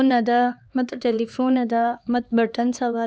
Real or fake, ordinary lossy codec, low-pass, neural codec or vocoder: fake; none; none; codec, 16 kHz, 2 kbps, X-Codec, HuBERT features, trained on LibriSpeech